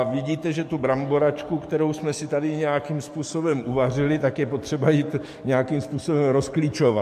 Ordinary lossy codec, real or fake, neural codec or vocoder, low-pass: MP3, 64 kbps; fake; codec, 44.1 kHz, 7.8 kbps, DAC; 14.4 kHz